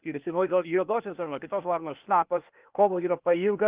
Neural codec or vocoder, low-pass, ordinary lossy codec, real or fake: codec, 16 kHz, 0.8 kbps, ZipCodec; 3.6 kHz; Opus, 24 kbps; fake